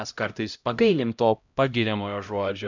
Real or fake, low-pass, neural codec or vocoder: fake; 7.2 kHz; codec, 16 kHz, 0.5 kbps, X-Codec, HuBERT features, trained on LibriSpeech